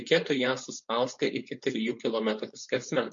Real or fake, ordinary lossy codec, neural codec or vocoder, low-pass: fake; MP3, 48 kbps; codec, 16 kHz, 4.8 kbps, FACodec; 7.2 kHz